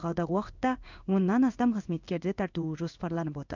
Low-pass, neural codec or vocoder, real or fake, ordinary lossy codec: 7.2 kHz; codec, 16 kHz in and 24 kHz out, 1 kbps, XY-Tokenizer; fake; none